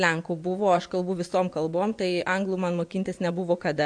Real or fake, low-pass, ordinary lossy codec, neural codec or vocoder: fake; 9.9 kHz; AAC, 64 kbps; vocoder, 24 kHz, 100 mel bands, Vocos